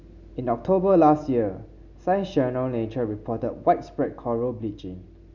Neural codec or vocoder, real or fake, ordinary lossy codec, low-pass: none; real; none; 7.2 kHz